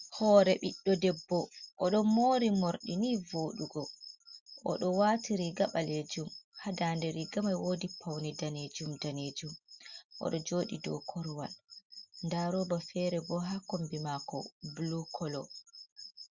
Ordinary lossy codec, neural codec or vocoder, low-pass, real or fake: Opus, 64 kbps; none; 7.2 kHz; real